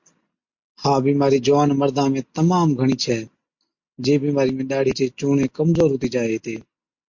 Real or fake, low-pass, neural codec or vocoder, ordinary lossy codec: real; 7.2 kHz; none; MP3, 48 kbps